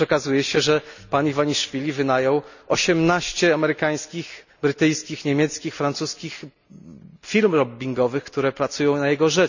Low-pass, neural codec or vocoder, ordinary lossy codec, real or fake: 7.2 kHz; none; none; real